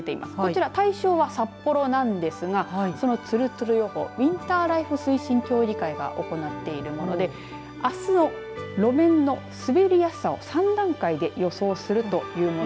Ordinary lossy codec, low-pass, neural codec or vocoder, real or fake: none; none; none; real